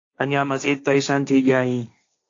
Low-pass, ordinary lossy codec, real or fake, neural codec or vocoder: 7.2 kHz; AAC, 48 kbps; fake; codec, 16 kHz, 1.1 kbps, Voila-Tokenizer